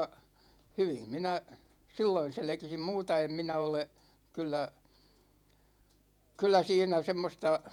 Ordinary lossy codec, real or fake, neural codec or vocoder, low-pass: none; fake; vocoder, 48 kHz, 128 mel bands, Vocos; 19.8 kHz